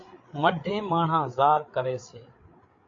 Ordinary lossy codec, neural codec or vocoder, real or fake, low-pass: AAC, 48 kbps; codec, 16 kHz, 8 kbps, FreqCodec, larger model; fake; 7.2 kHz